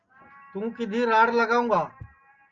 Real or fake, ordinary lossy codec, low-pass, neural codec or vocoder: real; Opus, 24 kbps; 7.2 kHz; none